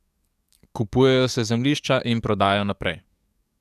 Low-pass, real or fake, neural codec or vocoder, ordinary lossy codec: 14.4 kHz; fake; codec, 44.1 kHz, 7.8 kbps, DAC; none